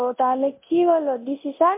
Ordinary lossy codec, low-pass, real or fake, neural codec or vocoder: MP3, 24 kbps; 3.6 kHz; fake; codec, 24 kHz, 0.9 kbps, DualCodec